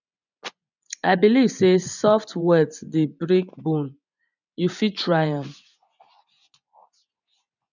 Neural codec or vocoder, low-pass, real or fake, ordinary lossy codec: vocoder, 24 kHz, 100 mel bands, Vocos; 7.2 kHz; fake; none